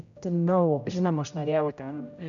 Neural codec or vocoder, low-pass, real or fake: codec, 16 kHz, 0.5 kbps, X-Codec, HuBERT features, trained on general audio; 7.2 kHz; fake